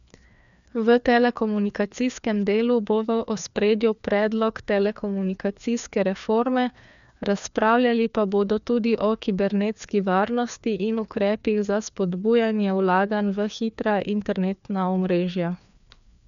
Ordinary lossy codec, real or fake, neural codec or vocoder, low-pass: MP3, 96 kbps; fake; codec, 16 kHz, 2 kbps, FreqCodec, larger model; 7.2 kHz